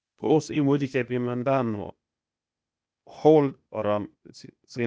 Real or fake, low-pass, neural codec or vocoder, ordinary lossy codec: fake; none; codec, 16 kHz, 0.8 kbps, ZipCodec; none